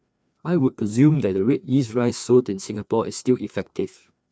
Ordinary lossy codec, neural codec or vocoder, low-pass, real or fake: none; codec, 16 kHz, 2 kbps, FreqCodec, larger model; none; fake